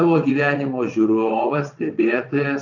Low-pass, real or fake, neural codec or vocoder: 7.2 kHz; fake; vocoder, 22.05 kHz, 80 mel bands, WaveNeXt